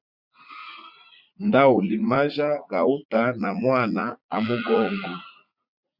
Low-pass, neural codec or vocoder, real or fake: 5.4 kHz; vocoder, 44.1 kHz, 80 mel bands, Vocos; fake